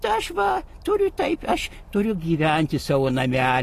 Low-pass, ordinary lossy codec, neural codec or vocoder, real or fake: 14.4 kHz; AAC, 48 kbps; none; real